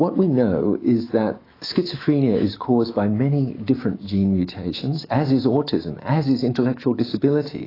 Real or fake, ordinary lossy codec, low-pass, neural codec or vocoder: fake; AAC, 24 kbps; 5.4 kHz; autoencoder, 48 kHz, 128 numbers a frame, DAC-VAE, trained on Japanese speech